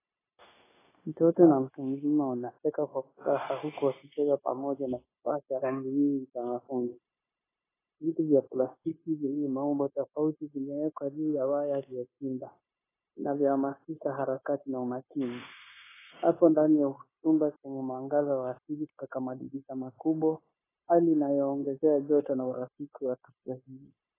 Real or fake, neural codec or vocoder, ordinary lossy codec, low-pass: fake; codec, 16 kHz, 0.9 kbps, LongCat-Audio-Codec; AAC, 16 kbps; 3.6 kHz